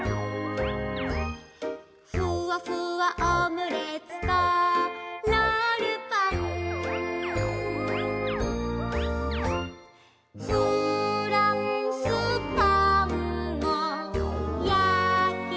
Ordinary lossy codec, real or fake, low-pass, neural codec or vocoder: none; real; none; none